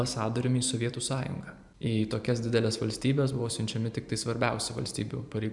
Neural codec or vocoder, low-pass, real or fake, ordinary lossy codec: vocoder, 44.1 kHz, 128 mel bands every 512 samples, BigVGAN v2; 10.8 kHz; fake; MP3, 96 kbps